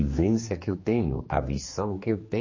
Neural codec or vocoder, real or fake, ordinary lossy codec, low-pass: codec, 16 kHz, 2 kbps, X-Codec, HuBERT features, trained on general audio; fake; MP3, 32 kbps; 7.2 kHz